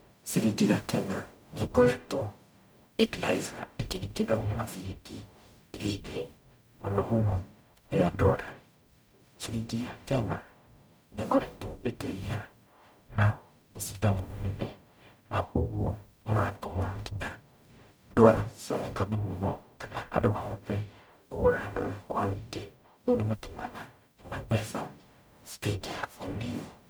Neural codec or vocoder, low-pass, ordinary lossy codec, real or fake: codec, 44.1 kHz, 0.9 kbps, DAC; none; none; fake